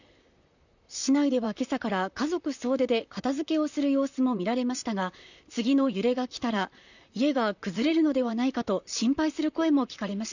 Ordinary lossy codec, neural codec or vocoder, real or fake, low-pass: none; vocoder, 44.1 kHz, 128 mel bands, Pupu-Vocoder; fake; 7.2 kHz